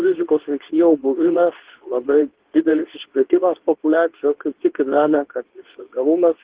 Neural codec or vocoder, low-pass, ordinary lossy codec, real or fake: codec, 24 kHz, 0.9 kbps, WavTokenizer, medium speech release version 2; 3.6 kHz; Opus, 16 kbps; fake